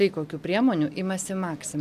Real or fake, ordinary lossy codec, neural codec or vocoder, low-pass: real; MP3, 96 kbps; none; 14.4 kHz